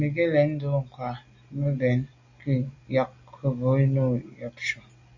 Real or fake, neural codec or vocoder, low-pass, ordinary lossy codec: real; none; 7.2 kHz; AAC, 48 kbps